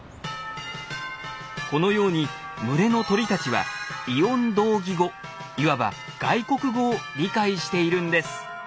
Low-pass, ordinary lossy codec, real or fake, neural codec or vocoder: none; none; real; none